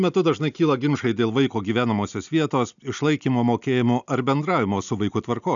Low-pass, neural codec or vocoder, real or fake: 7.2 kHz; none; real